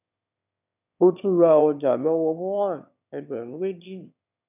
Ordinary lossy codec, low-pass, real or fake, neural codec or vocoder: none; 3.6 kHz; fake; autoencoder, 22.05 kHz, a latent of 192 numbers a frame, VITS, trained on one speaker